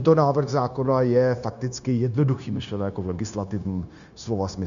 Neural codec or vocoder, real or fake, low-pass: codec, 16 kHz, 0.9 kbps, LongCat-Audio-Codec; fake; 7.2 kHz